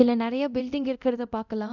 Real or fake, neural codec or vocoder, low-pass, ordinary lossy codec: fake; codec, 24 kHz, 0.9 kbps, DualCodec; 7.2 kHz; none